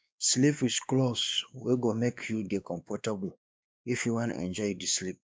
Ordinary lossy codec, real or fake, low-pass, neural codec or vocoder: none; fake; none; codec, 16 kHz, 2 kbps, X-Codec, WavLM features, trained on Multilingual LibriSpeech